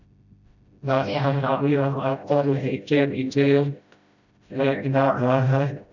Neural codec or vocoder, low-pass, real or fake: codec, 16 kHz, 0.5 kbps, FreqCodec, smaller model; 7.2 kHz; fake